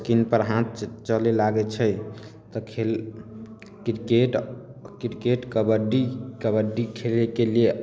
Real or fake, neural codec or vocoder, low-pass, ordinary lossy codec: real; none; none; none